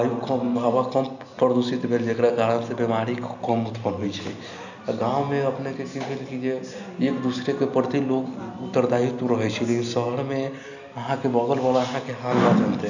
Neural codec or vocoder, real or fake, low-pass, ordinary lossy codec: none; real; 7.2 kHz; none